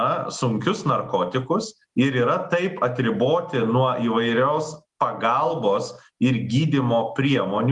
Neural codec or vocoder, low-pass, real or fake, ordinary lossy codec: none; 10.8 kHz; real; Opus, 32 kbps